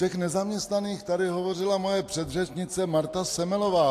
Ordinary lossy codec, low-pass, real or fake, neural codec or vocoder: AAC, 64 kbps; 14.4 kHz; real; none